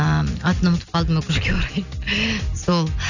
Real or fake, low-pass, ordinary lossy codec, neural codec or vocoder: real; 7.2 kHz; none; none